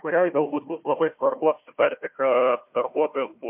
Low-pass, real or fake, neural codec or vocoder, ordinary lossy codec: 3.6 kHz; fake; codec, 16 kHz, 1 kbps, FunCodec, trained on Chinese and English, 50 frames a second; MP3, 32 kbps